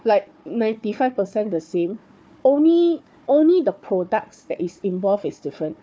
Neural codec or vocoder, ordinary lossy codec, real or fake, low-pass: codec, 16 kHz, 4 kbps, FunCodec, trained on Chinese and English, 50 frames a second; none; fake; none